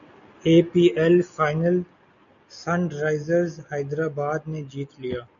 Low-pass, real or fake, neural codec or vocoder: 7.2 kHz; real; none